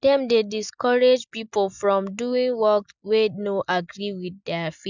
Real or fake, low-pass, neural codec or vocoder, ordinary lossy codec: real; 7.2 kHz; none; none